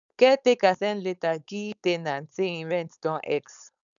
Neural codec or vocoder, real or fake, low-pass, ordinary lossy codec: codec, 16 kHz, 4.8 kbps, FACodec; fake; 7.2 kHz; none